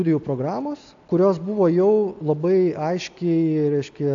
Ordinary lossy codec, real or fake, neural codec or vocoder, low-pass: AAC, 64 kbps; real; none; 7.2 kHz